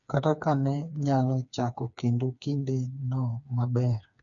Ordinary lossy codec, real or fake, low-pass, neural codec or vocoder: none; fake; 7.2 kHz; codec, 16 kHz, 4 kbps, FreqCodec, smaller model